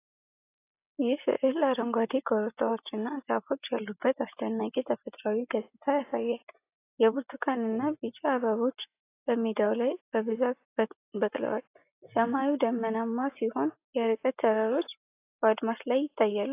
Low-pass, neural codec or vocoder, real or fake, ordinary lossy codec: 3.6 kHz; none; real; AAC, 24 kbps